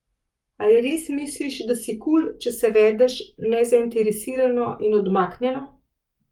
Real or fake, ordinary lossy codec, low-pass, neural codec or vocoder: fake; Opus, 32 kbps; 19.8 kHz; codec, 44.1 kHz, 7.8 kbps, Pupu-Codec